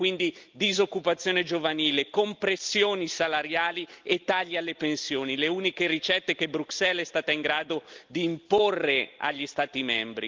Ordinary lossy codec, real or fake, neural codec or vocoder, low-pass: Opus, 32 kbps; real; none; 7.2 kHz